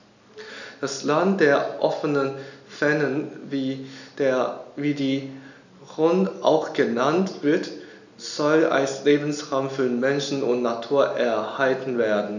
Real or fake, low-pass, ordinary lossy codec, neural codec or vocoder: real; 7.2 kHz; none; none